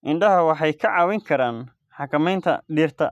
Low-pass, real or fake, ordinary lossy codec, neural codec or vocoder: 14.4 kHz; real; none; none